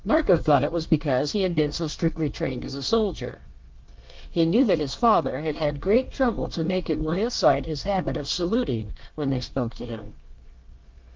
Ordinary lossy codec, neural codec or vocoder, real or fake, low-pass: Opus, 32 kbps; codec, 24 kHz, 1 kbps, SNAC; fake; 7.2 kHz